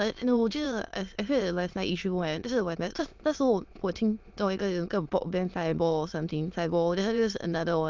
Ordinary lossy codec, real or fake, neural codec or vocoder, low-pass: Opus, 24 kbps; fake; autoencoder, 22.05 kHz, a latent of 192 numbers a frame, VITS, trained on many speakers; 7.2 kHz